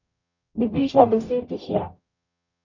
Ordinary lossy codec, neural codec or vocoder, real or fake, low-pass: none; codec, 44.1 kHz, 0.9 kbps, DAC; fake; 7.2 kHz